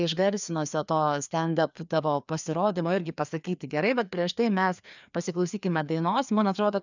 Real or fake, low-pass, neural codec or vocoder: fake; 7.2 kHz; codec, 16 kHz, 2 kbps, FreqCodec, larger model